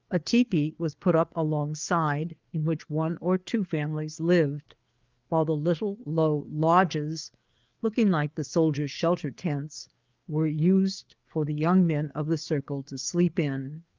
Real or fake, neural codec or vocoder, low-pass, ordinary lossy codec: fake; codec, 16 kHz, 4 kbps, FreqCodec, larger model; 7.2 kHz; Opus, 24 kbps